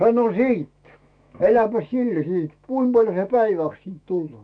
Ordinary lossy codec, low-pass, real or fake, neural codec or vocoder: none; 9.9 kHz; fake; autoencoder, 48 kHz, 128 numbers a frame, DAC-VAE, trained on Japanese speech